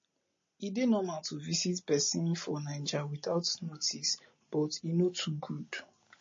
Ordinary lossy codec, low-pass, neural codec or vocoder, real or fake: MP3, 32 kbps; 7.2 kHz; none; real